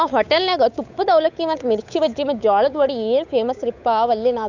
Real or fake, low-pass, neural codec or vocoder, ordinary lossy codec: fake; 7.2 kHz; codec, 16 kHz, 8 kbps, FunCodec, trained on Chinese and English, 25 frames a second; none